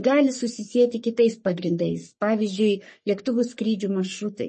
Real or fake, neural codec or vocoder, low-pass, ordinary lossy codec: fake; codec, 44.1 kHz, 3.4 kbps, Pupu-Codec; 10.8 kHz; MP3, 32 kbps